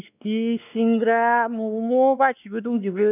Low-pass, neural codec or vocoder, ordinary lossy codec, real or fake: 3.6 kHz; codec, 16 kHz, 2 kbps, X-Codec, WavLM features, trained on Multilingual LibriSpeech; none; fake